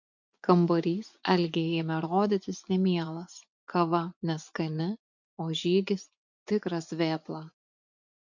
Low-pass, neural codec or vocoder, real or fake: 7.2 kHz; vocoder, 24 kHz, 100 mel bands, Vocos; fake